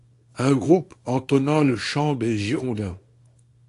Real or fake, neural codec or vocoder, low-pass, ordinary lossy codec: fake; codec, 24 kHz, 0.9 kbps, WavTokenizer, small release; 10.8 kHz; AAC, 48 kbps